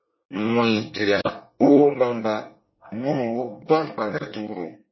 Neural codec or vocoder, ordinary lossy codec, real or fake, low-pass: codec, 24 kHz, 1 kbps, SNAC; MP3, 24 kbps; fake; 7.2 kHz